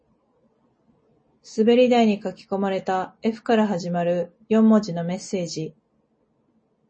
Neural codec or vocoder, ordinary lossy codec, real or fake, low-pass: none; MP3, 32 kbps; real; 9.9 kHz